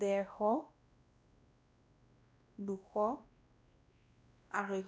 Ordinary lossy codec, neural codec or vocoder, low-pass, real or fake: none; codec, 16 kHz, 1 kbps, X-Codec, WavLM features, trained on Multilingual LibriSpeech; none; fake